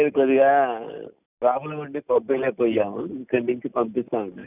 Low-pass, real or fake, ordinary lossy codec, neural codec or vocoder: 3.6 kHz; real; none; none